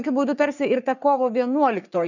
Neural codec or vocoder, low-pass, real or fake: codec, 44.1 kHz, 3.4 kbps, Pupu-Codec; 7.2 kHz; fake